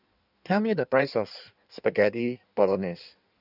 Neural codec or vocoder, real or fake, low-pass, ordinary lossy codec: codec, 16 kHz in and 24 kHz out, 1.1 kbps, FireRedTTS-2 codec; fake; 5.4 kHz; none